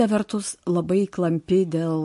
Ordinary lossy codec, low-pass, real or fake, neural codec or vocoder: MP3, 48 kbps; 14.4 kHz; real; none